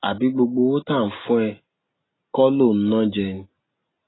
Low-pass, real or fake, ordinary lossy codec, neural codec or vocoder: 7.2 kHz; real; AAC, 16 kbps; none